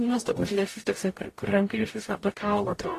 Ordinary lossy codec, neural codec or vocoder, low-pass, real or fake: AAC, 48 kbps; codec, 44.1 kHz, 0.9 kbps, DAC; 14.4 kHz; fake